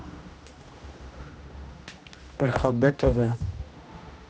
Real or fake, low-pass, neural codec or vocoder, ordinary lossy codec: fake; none; codec, 16 kHz, 1 kbps, X-Codec, HuBERT features, trained on general audio; none